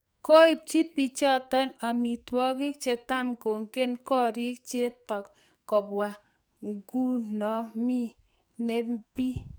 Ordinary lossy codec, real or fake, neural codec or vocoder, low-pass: none; fake; codec, 44.1 kHz, 2.6 kbps, SNAC; none